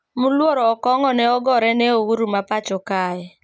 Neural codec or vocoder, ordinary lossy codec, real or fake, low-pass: none; none; real; none